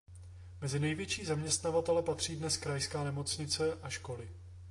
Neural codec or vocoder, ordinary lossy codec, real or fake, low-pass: none; AAC, 48 kbps; real; 10.8 kHz